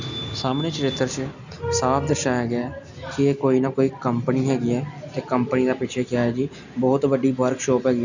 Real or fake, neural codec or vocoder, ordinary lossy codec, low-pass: real; none; none; 7.2 kHz